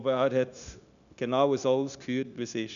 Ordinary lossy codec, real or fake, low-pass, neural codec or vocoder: none; fake; 7.2 kHz; codec, 16 kHz, 0.9 kbps, LongCat-Audio-Codec